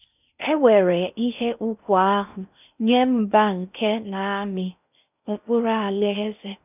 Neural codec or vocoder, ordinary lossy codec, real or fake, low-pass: codec, 16 kHz in and 24 kHz out, 0.6 kbps, FocalCodec, streaming, 4096 codes; none; fake; 3.6 kHz